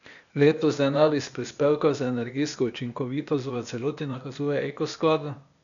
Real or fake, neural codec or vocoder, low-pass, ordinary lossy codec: fake; codec, 16 kHz, 0.8 kbps, ZipCodec; 7.2 kHz; none